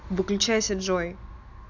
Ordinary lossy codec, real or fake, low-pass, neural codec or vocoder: none; real; 7.2 kHz; none